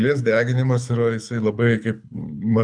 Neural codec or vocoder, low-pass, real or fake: codec, 24 kHz, 6 kbps, HILCodec; 9.9 kHz; fake